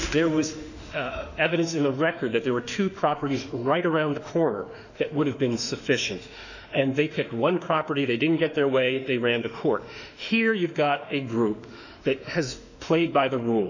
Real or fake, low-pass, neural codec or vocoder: fake; 7.2 kHz; autoencoder, 48 kHz, 32 numbers a frame, DAC-VAE, trained on Japanese speech